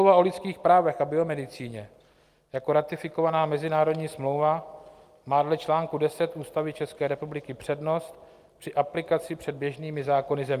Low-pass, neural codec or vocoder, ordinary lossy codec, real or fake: 14.4 kHz; none; Opus, 32 kbps; real